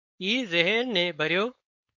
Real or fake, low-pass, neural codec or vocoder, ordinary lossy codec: fake; 7.2 kHz; codec, 16 kHz, 4.8 kbps, FACodec; MP3, 48 kbps